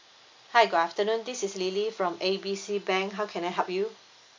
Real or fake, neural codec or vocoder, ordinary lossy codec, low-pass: real; none; MP3, 64 kbps; 7.2 kHz